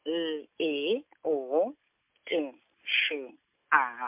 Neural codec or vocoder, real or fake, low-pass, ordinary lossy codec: none; real; 3.6 kHz; MP3, 32 kbps